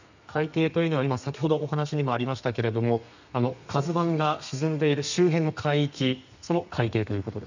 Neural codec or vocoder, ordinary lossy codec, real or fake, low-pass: codec, 32 kHz, 1.9 kbps, SNAC; none; fake; 7.2 kHz